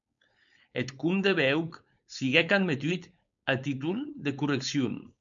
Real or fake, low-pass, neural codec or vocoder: fake; 7.2 kHz; codec, 16 kHz, 4.8 kbps, FACodec